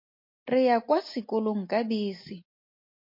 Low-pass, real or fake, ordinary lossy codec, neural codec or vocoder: 5.4 kHz; real; MP3, 32 kbps; none